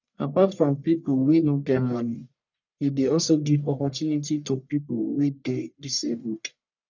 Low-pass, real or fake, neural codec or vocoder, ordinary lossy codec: 7.2 kHz; fake; codec, 44.1 kHz, 1.7 kbps, Pupu-Codec; none